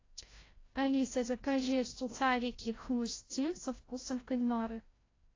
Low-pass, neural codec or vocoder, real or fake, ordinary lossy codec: 7.2 kHz; codec, 16 kHz, 0.5 kbps, FreqCodec, larger model; fake; AAC, 32 kbps